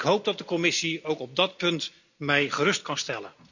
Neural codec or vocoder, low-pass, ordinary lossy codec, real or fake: none; 7.2 kHz; none; real